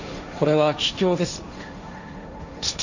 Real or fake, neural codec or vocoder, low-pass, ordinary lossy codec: fake; codec, 16 kHz, 1.1 kbps, Voila-Tokenizer; 7.2 kHz; none